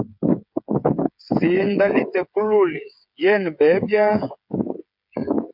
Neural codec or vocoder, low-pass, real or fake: codec, 16 kHz, 8 kbps, FreqCodec, smaller model; 5.4 kHz; fake